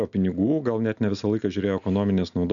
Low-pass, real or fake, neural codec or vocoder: 7.2 kHz; real; none